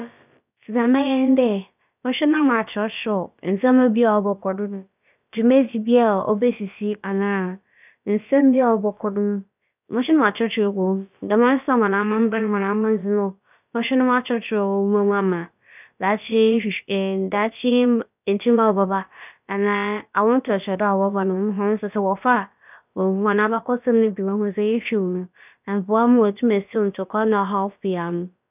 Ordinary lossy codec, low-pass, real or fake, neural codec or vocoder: none; 3.6 kHz; fake; codec, 16 kHz, about 1 kbps, DyCAST, with the encoder's durations